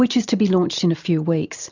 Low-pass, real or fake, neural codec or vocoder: 7.2 kHz; real; none